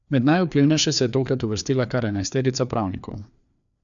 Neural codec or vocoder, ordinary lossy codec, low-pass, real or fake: codec, 16 kHz, 2 kbps, FreqCodec, larger model; none; 7.2 kHz; fake